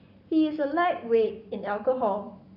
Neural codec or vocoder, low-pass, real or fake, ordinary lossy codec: codec, 44.1 kHz, 7.8 kbps, Pupu-Codec; 5.4 kHz; fake; none